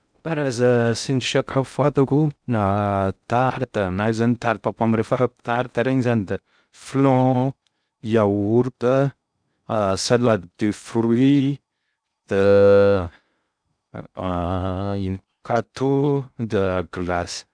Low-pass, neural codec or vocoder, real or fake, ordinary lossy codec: 9.9 kHz; codec, 16 kHz in and 24 kHz out, 0.6 kbps, FocalCodec, streaming, 2048 codes; fake; none